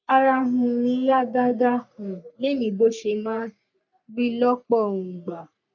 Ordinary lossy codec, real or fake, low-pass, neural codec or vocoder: none; fake; 7.2 kHz; codec, 44.1 kHz, 3.4 kbps, Pupu-Codec